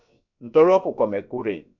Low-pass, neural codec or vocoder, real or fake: 7.2 kHz; codec, 16 kHz, about 1 kbps, DyCAST, with the encoder's durations; fake